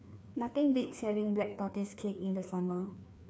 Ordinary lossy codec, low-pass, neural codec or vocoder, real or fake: none; none; codec, 16 kHz, 2 kbps, FreqCodec, larger model; fake